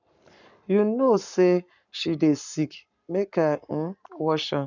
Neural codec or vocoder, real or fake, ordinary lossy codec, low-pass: codec, 44.1 kHz, 7.8 kbps, Pupu-Codec; fake; none; 7.2 kHz